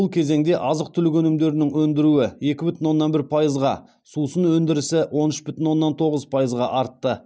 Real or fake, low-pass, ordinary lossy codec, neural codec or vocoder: real; none; none; none